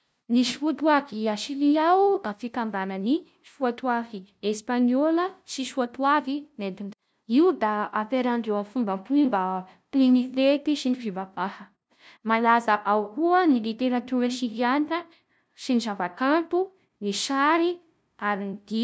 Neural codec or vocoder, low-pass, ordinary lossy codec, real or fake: codec, 16 kHz, 0.5 kbps, FunCodec, trained on LibriTTS, 25 frames a second; none; none; fake